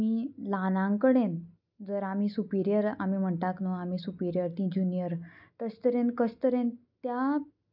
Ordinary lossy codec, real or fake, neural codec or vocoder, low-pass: none; real; none; 5.4 kHz